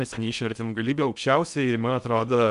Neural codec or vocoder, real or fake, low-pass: codec, 16 kHz in and 24 kHz out, 0.6 kbps, FocalCodec, streaming, 2048 codes; fake; 10.8 kHz